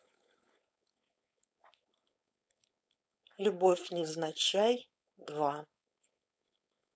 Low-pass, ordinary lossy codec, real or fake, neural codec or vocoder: none; none; fake; codec, 16 kHz, 4.8 kbps, FACodec